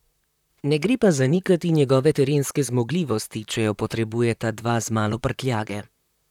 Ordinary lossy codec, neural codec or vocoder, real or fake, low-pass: none; vocoder, 44.1 kHz, 128 mel bands, Pupu-Vocoder; fake; 19.8 kHz